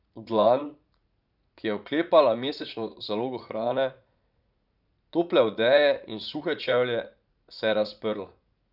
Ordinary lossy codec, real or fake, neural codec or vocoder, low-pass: none; fake; vocoder, 44.1 kHz, 80 mel bands, Vocos; 5.4 kHz